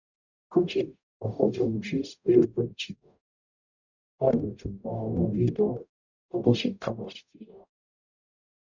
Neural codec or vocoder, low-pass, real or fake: codec, 44.1 kHz, 0.9 kbps, DAC; 7.2 kHz; fake